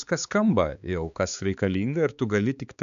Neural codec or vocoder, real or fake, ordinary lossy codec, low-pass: codec, 16 kHz, 4 kbps, X-Codec, HuBERT features, trained on balanced general audio; fake; AAC, 96 kbps; 7.2 kHz